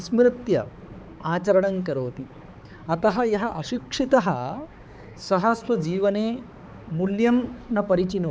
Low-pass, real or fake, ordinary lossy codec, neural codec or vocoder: none; fake; none; codec, 16 kHz, 4 kbps, X-Codec, HuBERT features, trained on balanced general audio